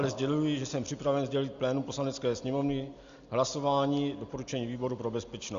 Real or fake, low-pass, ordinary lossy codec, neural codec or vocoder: real; 7.2 kHz; Opus, 64 kbps; none